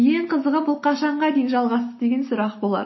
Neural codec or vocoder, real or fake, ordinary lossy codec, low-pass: none; real; MP3, 24 kbps; 7.2 kHz